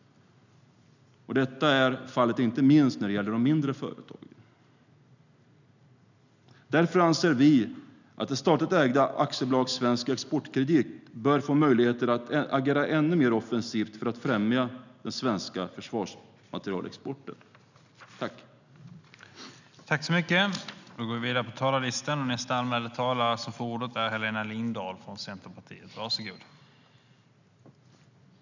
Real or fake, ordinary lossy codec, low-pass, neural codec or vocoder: real; none; 7.2 kHz; none